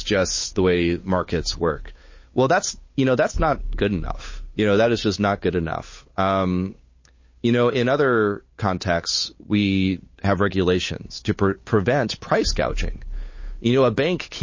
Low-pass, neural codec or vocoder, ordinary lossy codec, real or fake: 7.2 kHz; codec, 16 kHz, 8 kbps, FunCodec, trained on Chinese and English, 25 frames a second; MP3, 32 kbps; fake